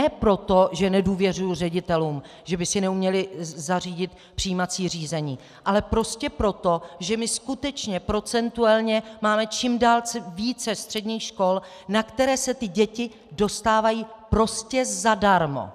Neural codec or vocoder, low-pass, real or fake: none; 14.4 kHz; real